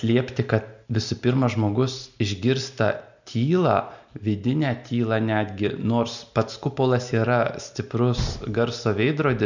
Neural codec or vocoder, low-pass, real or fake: none; 7.2 kHz; real